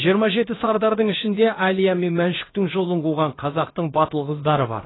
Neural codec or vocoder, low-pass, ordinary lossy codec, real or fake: codec, 16 kHz, about 1 kbps, DyCAST, with the encoder's durations; 7.2 kHz; AAC, 16 kbps; fake